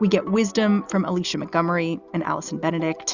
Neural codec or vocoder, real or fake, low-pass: none; real; 7.2 kHz